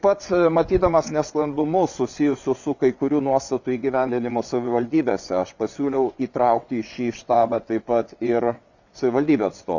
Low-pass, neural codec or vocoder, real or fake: 7.2 kHz; vocoder, 22.05 kHz, 80 mel bands, WaveNeXt; fake